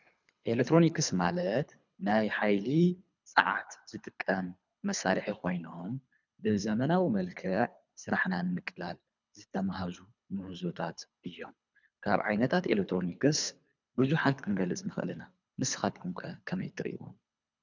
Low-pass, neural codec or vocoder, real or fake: 7.2 kHz; codec, 24 kHz, 3 kbps, HILCodec; fake